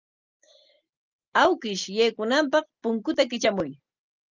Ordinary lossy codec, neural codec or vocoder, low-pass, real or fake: Opus, 24 kbps; none; 7.2 kHz; real